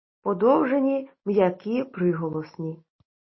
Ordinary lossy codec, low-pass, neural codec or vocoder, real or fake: MP3, 24 kbps; 7.2 kHz; none; real